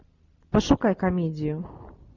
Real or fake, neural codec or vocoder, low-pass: real; none; 7.2 kHz